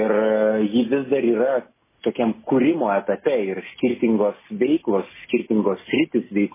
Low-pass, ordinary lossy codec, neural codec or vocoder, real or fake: 3.6 kHz; MP3, 16 kbps; none; real